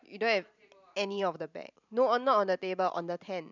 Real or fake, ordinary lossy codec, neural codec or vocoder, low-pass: fake; none; vocoder, 44.1 kHz, 128 mel bands every 512 samples, BigVGAN v2; 7.2 kHz